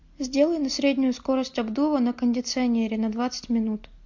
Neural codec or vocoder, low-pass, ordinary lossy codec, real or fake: none; 7.2 kHz; MP3, 48 kbps; real